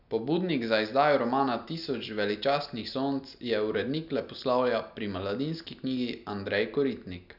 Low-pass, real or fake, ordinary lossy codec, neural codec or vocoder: 5.4 kHz; real; none; none